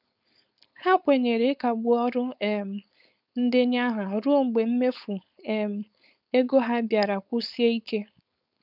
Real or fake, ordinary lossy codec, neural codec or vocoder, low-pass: fake; none; codec, 16 kHz, 4.8 kbps, FACodec; 5.4 kHz